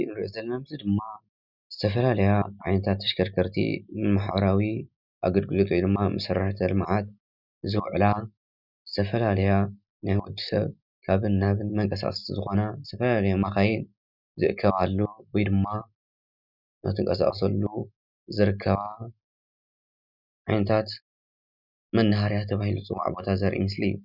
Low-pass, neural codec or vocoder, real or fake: 5.4 kHz; none; real